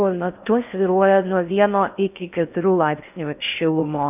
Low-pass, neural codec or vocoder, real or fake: 3.6 kHz; codec, 16 kHz in and 24 kHz out, 0.6 kbps, FocalCodec, streaming, 4096 codes; fake